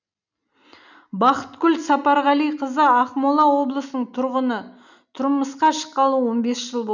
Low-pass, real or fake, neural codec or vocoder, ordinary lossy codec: 7.2 kHz; real; none; none